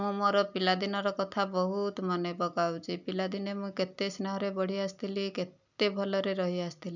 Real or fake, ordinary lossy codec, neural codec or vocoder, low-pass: real; none; none; 7.2 kHz